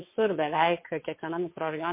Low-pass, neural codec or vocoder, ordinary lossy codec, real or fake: 3.6 kHz; vocoder, 22.05 kHz, 80 mel bands, WaveNeXt; MP3, 24 kbps; fake